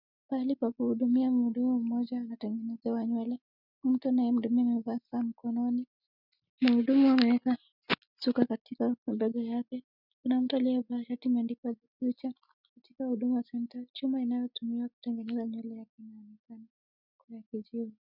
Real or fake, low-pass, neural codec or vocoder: real; 5.4 kHz; none